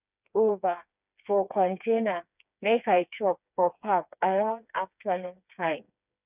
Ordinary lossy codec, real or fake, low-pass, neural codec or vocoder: none; fake; 3.6 kHz; codec, 16 kHz, 4 kbps, FreqCodec, smaller model